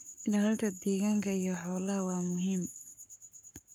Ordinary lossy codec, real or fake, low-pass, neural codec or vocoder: none; fake; none; codec, 44.1 kHz, 7.8 kbps, Pupu-Codec